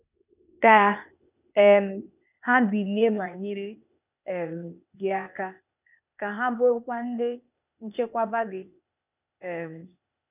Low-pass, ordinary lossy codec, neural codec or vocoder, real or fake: 3.6 kHz; none; codec, 16 kHz, 0.8 kbps, ZipCodec; fake